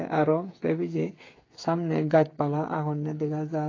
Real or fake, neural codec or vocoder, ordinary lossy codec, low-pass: fake; codec, 16 kHz, 8 kbps, FreqCodec, smaller model; AAC, 32 kbps; 7.2 kHz